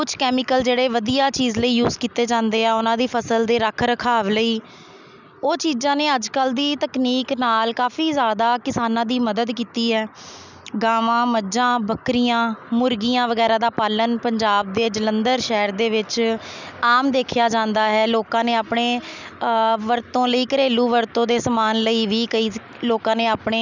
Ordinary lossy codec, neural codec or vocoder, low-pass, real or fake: none; none; 7.2 kHz; real